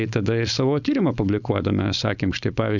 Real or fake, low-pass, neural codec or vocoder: fake; 7.2 kHz; codec, 16 kHz, 4.8 kbps, FACodec